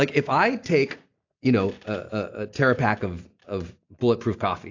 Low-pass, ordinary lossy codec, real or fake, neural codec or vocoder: 7.2 kHz; AAC, 48 kbps; real; none